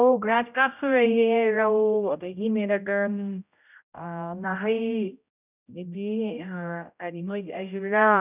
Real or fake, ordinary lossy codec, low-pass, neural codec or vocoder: fake; none; 3.6 kHz; codec, 16 kHz, 0.5 kbps, X-Codec, HuBERT features, trained on general audio